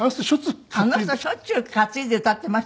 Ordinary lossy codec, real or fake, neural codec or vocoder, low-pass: none; real; none; none